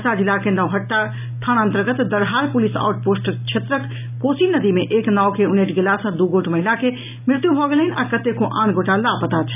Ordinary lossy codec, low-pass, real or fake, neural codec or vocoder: none; 3.6 kHz; real; none